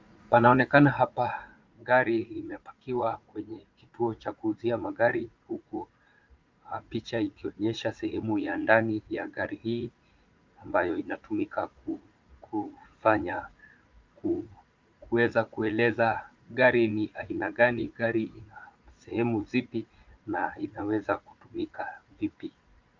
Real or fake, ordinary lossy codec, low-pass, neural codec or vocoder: fake; Opus, 32 kbps; 7.2 kHz; vocoder, 44.1 kHz, 80 mel bands, Vocos